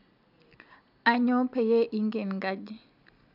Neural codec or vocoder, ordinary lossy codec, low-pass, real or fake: none; none; 5.4 kHz; real